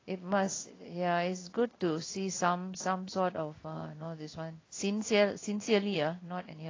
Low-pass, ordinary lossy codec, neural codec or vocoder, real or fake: 7.2 kHz; AAC, 32 kbps; codec, 16 kHz in and 24 kHz out, 1 kbps, XY-Tokenizer; fake